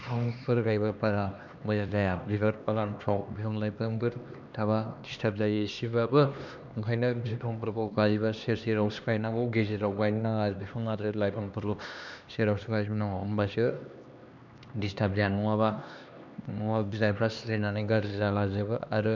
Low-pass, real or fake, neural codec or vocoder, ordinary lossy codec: 7.2 kHz; fake; codec, 16 kHz, 2 kbps, X-Codec, HuBERT features, trained on LibriSpeech; none